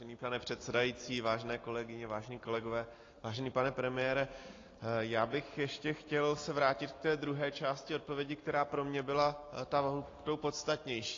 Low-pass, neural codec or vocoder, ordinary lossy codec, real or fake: 7.2 kHz; none; AAC, 32 kbps; real